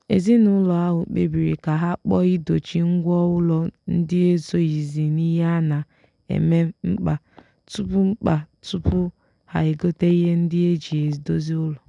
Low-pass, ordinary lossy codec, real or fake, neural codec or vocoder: 10.8 kHz; none; real; none